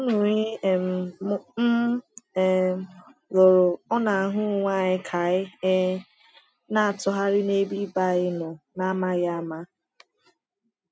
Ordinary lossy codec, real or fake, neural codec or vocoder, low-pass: none; real; none; none